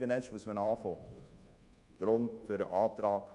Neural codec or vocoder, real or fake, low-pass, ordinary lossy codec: codec, 24 kHz, 1.2 kbps, DualCodec; fake; 10.8 kHz; MP3, 96 kbps